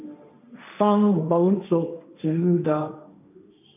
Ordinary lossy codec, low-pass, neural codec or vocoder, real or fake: MP3, 32 kbps; 3.6 kHz; codec, 16 kHz, 1.1 kbps, Voila-Tokenizer; fake